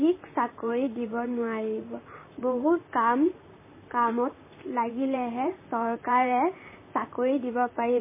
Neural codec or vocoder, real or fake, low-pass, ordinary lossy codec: vocoder, 44.1 kHz, 128 mel bands, Pupu-Vocoder; fake; 3.6 kHz; MP3, 16 kbps